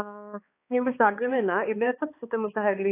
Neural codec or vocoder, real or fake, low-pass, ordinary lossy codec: codec, 16 kHz, 2 kbps, X-Codec, HuBERT features, trained on balanced general audio; fake; 3.6 kHz; AAC, 24 kbps